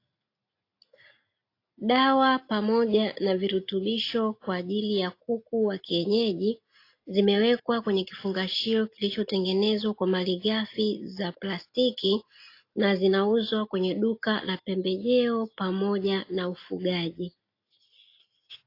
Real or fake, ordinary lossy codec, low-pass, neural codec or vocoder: real; AAC, 32 kbps; 5.4 kHz; none